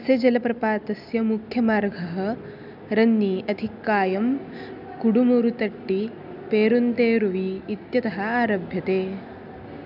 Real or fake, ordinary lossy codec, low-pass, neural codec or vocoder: real; none; 5.4 kHz; none